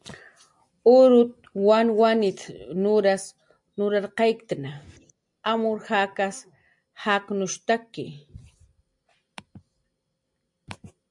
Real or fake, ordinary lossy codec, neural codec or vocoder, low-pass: real; MP3, 64 kbps; none; 10.8 kHz